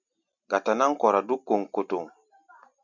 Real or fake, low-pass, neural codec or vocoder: real; 7.2 kHz; none